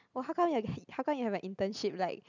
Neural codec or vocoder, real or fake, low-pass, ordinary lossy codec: none; real; 7.2 kHz; none